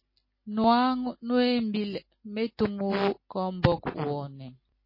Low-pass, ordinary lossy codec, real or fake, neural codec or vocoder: 5.4 kHz; MP3, 24 kbps; real; none